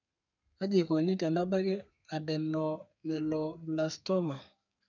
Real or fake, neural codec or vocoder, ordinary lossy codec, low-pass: fake; codec, 32 kHz, 1.9 kbps, SNAC; none; 7.2 kHz